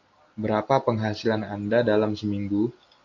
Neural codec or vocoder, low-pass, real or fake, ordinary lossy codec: none; 7.2 kHz; real; AAC, 48 kbps